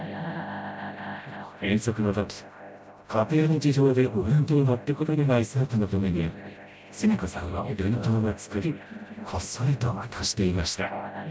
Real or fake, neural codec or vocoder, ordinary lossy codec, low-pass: fake; codec, 16 kHz, 0.5 kbps, FreqCodec, smaller model; none; none